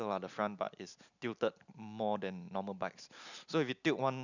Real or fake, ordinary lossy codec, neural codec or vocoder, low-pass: real; none; none; 7.2 kHz